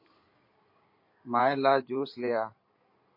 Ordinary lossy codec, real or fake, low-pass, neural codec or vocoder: MP3, 32 kbps; fake; 5.4 kHz; codec, 16 kHz in and 24 kHz out, 2.2 kbps, FireRedTTS-2 codec